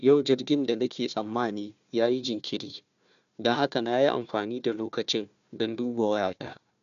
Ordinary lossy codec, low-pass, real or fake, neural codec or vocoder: MP3, 96 kbps; 7.2 kHz; fake; codec, 16 kHz, 1 kbps, FunCodec, trained on Chinese and English, 50 frames a second